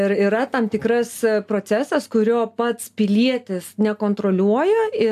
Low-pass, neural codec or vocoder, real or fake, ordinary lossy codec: 14.4 kHz; none; real; AAC, 96 kbps